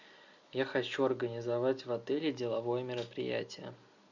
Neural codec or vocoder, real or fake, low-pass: none; real; 7.2 kHz